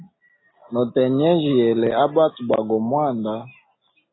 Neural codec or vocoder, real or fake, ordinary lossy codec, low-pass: none; real; AAC, 16 kbps; 7.2 kHz